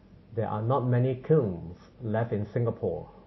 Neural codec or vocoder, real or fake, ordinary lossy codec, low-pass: none; real; MP3, 24 kbps; 7.2 kHz